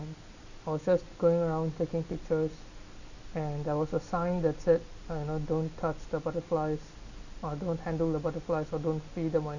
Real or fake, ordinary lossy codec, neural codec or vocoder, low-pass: real; none; none; 7.2 kHz